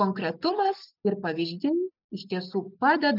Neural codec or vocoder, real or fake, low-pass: none; real; 5.4 kHz